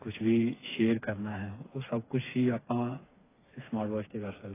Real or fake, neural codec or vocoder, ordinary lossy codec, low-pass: fake; codec, 16 kHz, 4 kbps, FreqCodec, smaller model; AAC, 16 kbps; 3.6 kHz